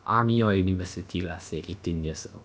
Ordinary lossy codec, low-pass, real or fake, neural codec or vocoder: none; none; fake; codec, 16 kHz, about 1 kbps, DyCAST, with the encoder's durations